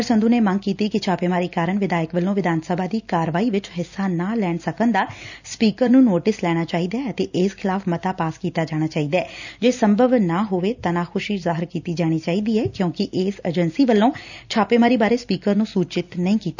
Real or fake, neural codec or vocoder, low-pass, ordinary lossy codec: real; none; 7.2 kHz; none